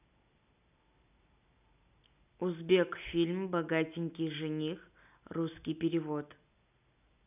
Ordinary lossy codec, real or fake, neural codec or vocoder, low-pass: none; real; none; 3.6 kHz